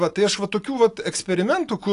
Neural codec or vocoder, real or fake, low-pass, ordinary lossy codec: none; real; 10.8 kHz; AAC, 48 kbps